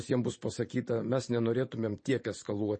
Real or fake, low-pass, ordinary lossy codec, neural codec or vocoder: fake; 9.9 kHz; MP3, 32 kbps; vocoder, 44.1 kHz, 128 mel bands every 512 samples, BigVGAN v2